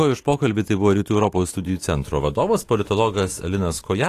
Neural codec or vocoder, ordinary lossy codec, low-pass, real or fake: autoencoder, 48 kHz, 128 numbers a frame, DAC-VAE, trained on Japanese speech; AAC, 48 kbps; 14.4 kHz; fake